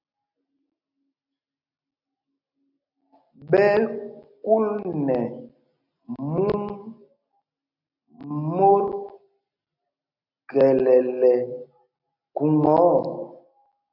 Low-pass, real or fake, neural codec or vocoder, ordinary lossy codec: 5.4 kHz; real; none; AAC, 48 kbps